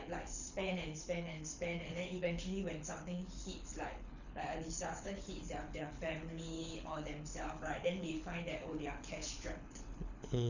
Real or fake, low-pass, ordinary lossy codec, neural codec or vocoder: fake; 7.2 kHz; none; codec, 24 kHz, 6 kbps, HILCodec